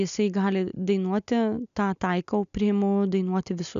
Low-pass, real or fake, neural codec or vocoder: 7.2 kHz; real; none